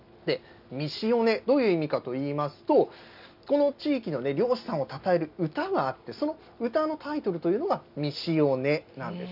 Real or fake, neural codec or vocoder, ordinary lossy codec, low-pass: real; none; none; 5.4 kHz